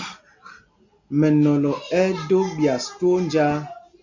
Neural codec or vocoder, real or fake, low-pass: none; real; 7.2 kHz